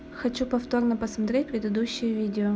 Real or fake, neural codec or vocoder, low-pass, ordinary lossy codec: real; none; none; none